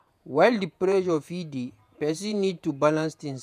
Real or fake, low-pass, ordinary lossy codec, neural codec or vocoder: fake; 14.4 kHz; none; vocoder, 48 kHz, 128 mel bands, Vocos